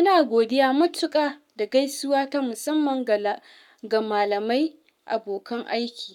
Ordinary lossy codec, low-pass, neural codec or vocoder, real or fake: none; 19.8 kHz; codec, 44.1 kHz, 7.8 kbps, Pupu-Codec; fake